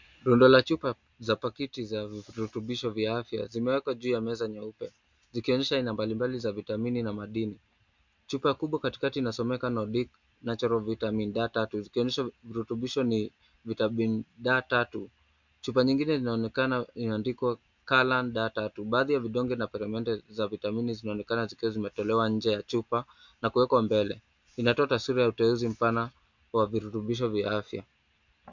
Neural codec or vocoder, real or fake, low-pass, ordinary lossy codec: none; real; 7.2 kHz; MP3, 64 kbps